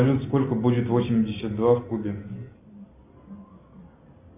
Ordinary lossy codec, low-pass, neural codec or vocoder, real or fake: AAC, 32 kbps; 3.6 kHz; none; real